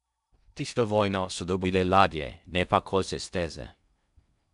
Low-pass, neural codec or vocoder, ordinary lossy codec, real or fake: 10.8 kHz; codec, 16 kHz in and 24 kHz out, 0.6 kbps, FocalCodec, streaming, 2048 codes; none; fake